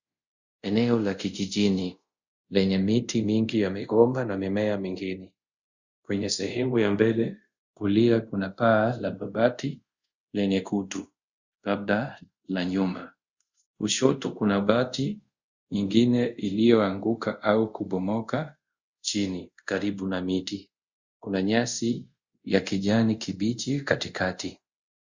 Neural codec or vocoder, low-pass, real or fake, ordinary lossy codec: codec, 24 kHz, 0.5 kbps, DualCodec; 7.2 kHz; fake; Opus, 64 kbps